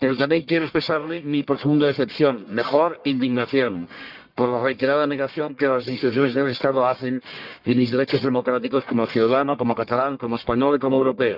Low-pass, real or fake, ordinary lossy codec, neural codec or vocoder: 5.4 kHz; fake; Opus, 64 kbps; codec, 44.1 kHz, 1.7 kbps, Pupu-Codec